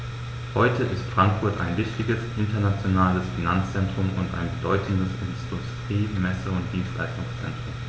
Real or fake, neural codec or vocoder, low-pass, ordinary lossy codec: real; none; none; none